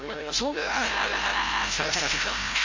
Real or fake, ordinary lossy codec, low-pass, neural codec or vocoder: fake; MP3, 32 kbps; 7.2 kHz; codec, 16 kHz, 0.5 kbps, FreqCodec, larger model